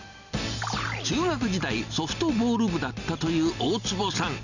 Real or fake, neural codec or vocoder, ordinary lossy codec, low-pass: real; none; none; 7.2 kHz